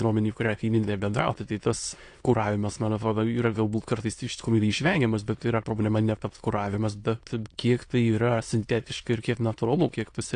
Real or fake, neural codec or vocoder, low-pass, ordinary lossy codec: fake; autoencoder, 22.05 kHz, a latent of 192 numbers a frame, VITS, trained on many speakers; 9.9 kHz; AAC, 48 kbps